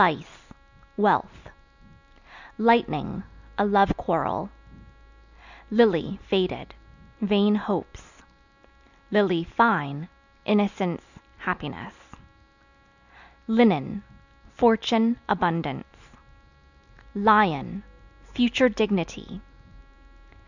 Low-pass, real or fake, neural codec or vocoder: 7.2 kHz; real; none